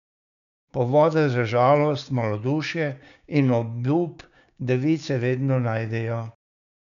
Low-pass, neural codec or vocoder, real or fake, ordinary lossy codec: 7.2 kHz; codec, 16 kHz, 6 kbps, DAC; fake; none